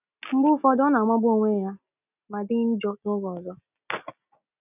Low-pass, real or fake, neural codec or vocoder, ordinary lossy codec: 3.6 kHz; real; none; none